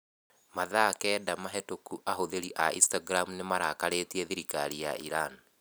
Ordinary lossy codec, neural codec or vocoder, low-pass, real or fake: none; none; none; real